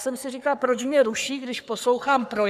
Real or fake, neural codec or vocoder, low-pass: fake; codec, 44.1 kHz, 3.4 kbps, Pupu-Codec; 14.4 kHz